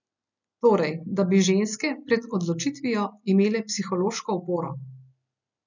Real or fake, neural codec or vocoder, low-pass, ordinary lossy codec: real; none; 7.2 kHz; none